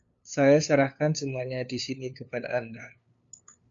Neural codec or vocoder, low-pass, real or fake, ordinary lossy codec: codec, 16 kHz, 2 kbps, FunCodec, trained on LibriTTS, 25 frames a second; 7.2 kHz; fake; AAC, 64 kbps